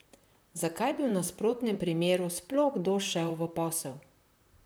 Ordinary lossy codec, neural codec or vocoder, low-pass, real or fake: none; vocoder, 44.1 kHz, 128 mel bands, Pupu-Vocoder; none; fake